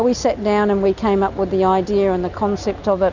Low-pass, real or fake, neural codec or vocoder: 7.2 kHz; real; none